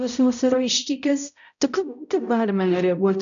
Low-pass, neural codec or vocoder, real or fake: 7.2 kHz; codec, 16 kHz, 0.5 kbps, X-Codec, HuBERT features, trained on balanced general audio; fake